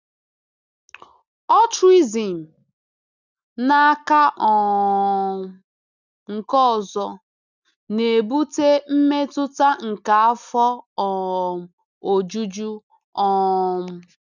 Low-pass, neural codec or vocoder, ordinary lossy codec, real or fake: 7.2 kHz; none; none; real